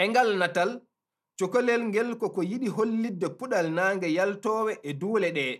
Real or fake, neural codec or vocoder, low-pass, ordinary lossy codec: real; none; 14.4 kHz; none